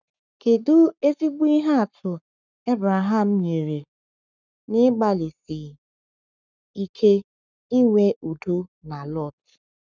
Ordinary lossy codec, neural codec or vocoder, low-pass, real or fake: none; codec, 44.1 kHz, 7.8 kbps, Pupu-Codec; 7.2 kHz; fake